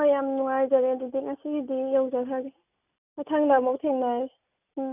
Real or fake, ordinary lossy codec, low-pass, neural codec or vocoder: real; none; 3.6 kHz; none